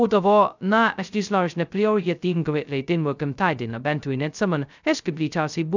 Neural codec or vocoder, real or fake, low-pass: codec, 16 kHz, 0.2 kbps, FocalCodec; fake; 7.2 kHz